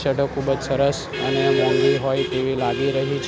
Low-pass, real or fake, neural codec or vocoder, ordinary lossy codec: none; real; none; none